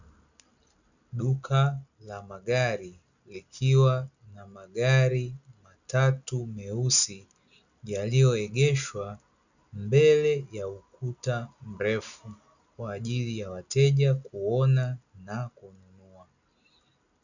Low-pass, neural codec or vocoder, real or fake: 7.2 kHz; none; real